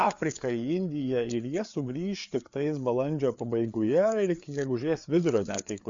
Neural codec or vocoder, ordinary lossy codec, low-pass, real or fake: codec, 16 kHz, 4 kbps, FreqCodec, larger model; Opus, 64 kbps; 7.2 kHz; fake